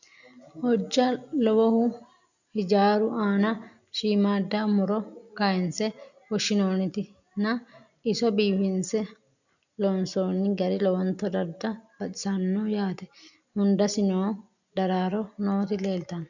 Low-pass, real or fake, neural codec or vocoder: 7.2 kHz; real; none